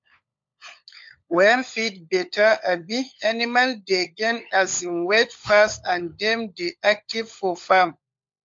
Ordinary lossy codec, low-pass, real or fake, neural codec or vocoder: MP3, 48 kbps; 7.2 kHz; fake; codec, 16 kHz, 16 kbps, FunCodec, trained on LibriTTS, 50 frames a second